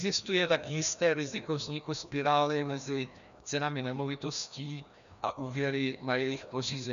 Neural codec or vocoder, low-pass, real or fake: codec, 16 kHz, 1 kbps, FreqCodec, larger model; 7.2 kHz; fake